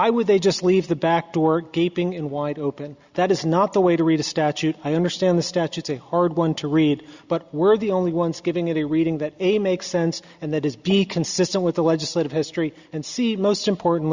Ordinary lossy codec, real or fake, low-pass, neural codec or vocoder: Opus, 64 kbps; real; 7.2 kHz; none